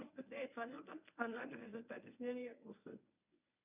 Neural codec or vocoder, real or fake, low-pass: codec, 24 kHz, 0.9 kbps, WavTokenizer, medium speech release version 1; fake; 3.6 kHz